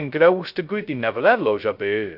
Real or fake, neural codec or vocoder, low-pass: fake; codec, 16 kHz, 0.2 kbps, FocalCodec; 5.4 kHz